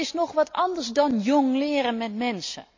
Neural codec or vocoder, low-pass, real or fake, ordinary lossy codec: none; 7.2 kHz; real; MP3, 64 kbps